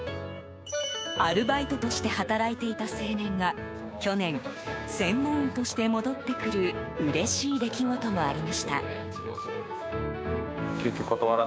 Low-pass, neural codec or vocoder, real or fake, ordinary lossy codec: none; codec, 16 kHz, 6 kbps, DAC; fake; none